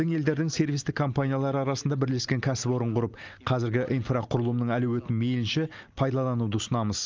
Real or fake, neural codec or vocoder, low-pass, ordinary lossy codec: real; none; 7.2 kHz; Opus, 24 kbps